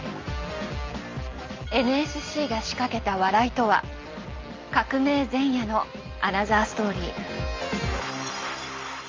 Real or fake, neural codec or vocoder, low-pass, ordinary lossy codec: real; none; 7.2 kHz; Opus, 32 kbps